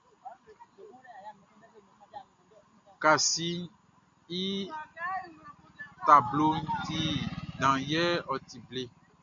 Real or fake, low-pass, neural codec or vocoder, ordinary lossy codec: real; 7.2 kHz; none; MP3, 48 kbps